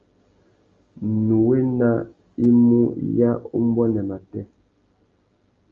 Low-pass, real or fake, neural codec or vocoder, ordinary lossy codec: 7.2 kHz; real; none; Opus, 32 kbps